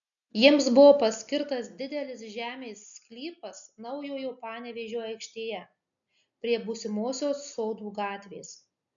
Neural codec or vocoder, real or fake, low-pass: none; real; 7.2 kHz